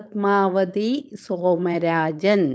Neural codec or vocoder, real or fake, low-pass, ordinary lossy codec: codec, 16 kHz, 4.8 kbps, FACodec; fake; none; none